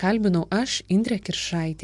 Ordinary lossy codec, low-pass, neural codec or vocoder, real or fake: MP3, 64 kbps; 10.8 kHz; none; real